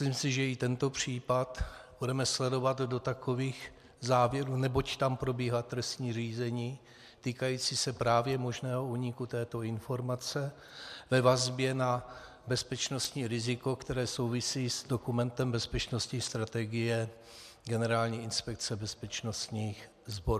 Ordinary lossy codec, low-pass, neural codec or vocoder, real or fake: MP3, 96 kbps; 14.4 kHz; none; real